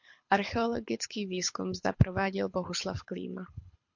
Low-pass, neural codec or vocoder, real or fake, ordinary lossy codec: 7.2 kHz; codec, 24 kHz, 6 kbps, HILCodec; fake; MP3, 48 kbps